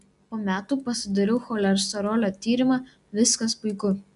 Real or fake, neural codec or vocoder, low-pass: real; none; 10.8 kHz